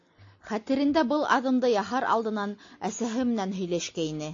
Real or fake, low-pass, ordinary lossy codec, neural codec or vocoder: real; 7.2 kHz; AAC, 48 kbps; none